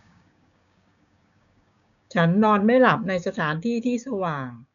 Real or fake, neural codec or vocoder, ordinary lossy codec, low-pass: fake; codec, 16 kHz, 6 kbps, DAC; none; 7.2 kHz